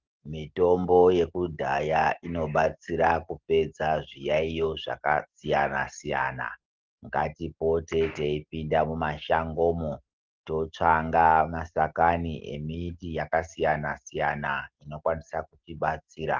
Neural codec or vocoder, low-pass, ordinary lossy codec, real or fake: none; 7.2 kHz; Opus, 16 kbps; real